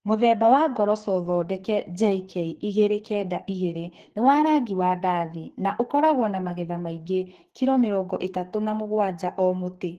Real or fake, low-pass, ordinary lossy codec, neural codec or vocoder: fake; 14.4 kHz; Opus, 16 kbps; codec, 44.1 kHz, 2.6 kbps, SNAC